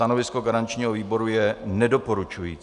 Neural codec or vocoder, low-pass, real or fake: none; 10.8 kHz; real